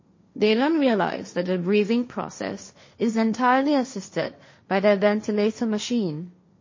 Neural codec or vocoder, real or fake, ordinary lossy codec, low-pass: codec, 16 kHz, 1.1 kbps, Voila-Tokenizer; fake; MP3, 32 kbps; 7.2 kHz